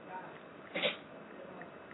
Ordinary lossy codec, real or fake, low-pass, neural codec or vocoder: AAC, 16 kbps; real; 7.2 kHz; none